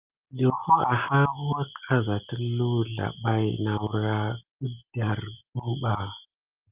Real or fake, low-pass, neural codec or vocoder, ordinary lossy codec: real; 3.6 kHz; none; Opus, 24 kbps